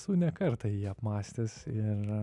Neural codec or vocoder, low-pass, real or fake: none; 10.8 kHz; real